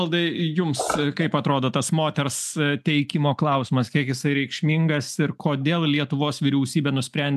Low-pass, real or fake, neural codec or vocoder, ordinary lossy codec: 14.4 kHz; real; none; AAC, 96 kbps